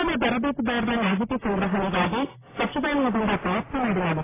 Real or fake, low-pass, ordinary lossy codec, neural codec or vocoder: real; 3.6 kHz; AAC, 16 kbps; none